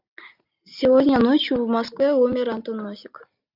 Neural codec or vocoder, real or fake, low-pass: none; real; 5.4 kHz